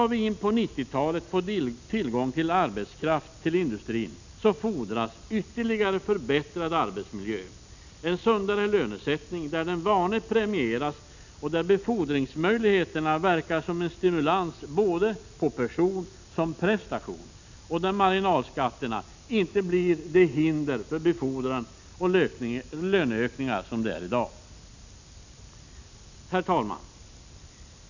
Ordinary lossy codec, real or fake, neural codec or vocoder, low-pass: none; real; none; 7.2 kHz